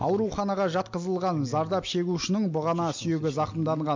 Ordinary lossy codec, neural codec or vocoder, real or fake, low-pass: MP3, 48 kbps; none; real; 7.2 kHz